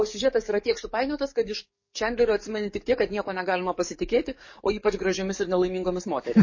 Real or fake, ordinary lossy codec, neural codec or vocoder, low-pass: fake; MP3, 32 kbps; codec, 44.1 kHz, 7.8 kbps, DAC; 7.2 kHz